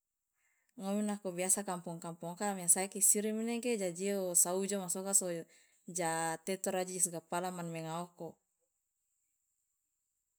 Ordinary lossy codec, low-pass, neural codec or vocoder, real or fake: none; none; none; real